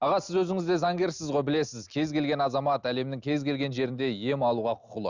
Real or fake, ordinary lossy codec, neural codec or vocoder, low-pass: real; Opus, 64 kbps; none; 7.2 kHz